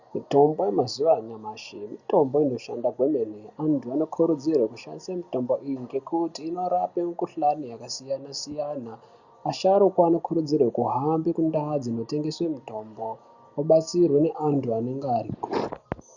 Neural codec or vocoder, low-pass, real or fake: none; 7.2 kHz; real